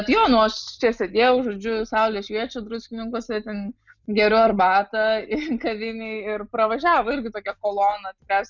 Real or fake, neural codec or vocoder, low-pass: real; none; 7.2 kHz